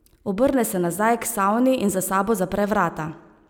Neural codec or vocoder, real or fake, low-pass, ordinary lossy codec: none; real; none; none